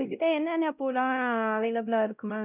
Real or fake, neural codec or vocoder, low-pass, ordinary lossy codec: fake; codec, 16 kHz, 0.5 kbps, X-Codec, WavLM features, trained on Multilingual LibriSpeech; 3.6 kHz; none